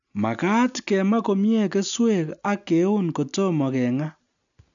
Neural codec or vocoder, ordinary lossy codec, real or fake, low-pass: none; none; real; 7.2 kHz